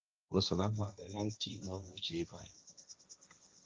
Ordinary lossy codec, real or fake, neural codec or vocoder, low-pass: Opus, 24 kbps; fake; codec, 16 kHz, 1.1 kbps, Voila-Tokenizer; 7.2 kHz